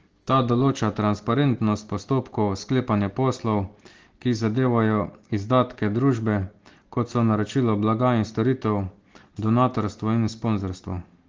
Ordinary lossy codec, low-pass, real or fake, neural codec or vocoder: Opus, 16 kbps; 7.2 kHz; real; none